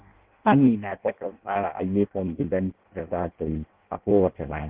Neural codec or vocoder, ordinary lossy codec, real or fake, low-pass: codec, 16 kHz in and 24 kHz out, 0.6 kbps, FireRedTTS-2 codec; Opus, 16 kbps; fake; 3.6 kHz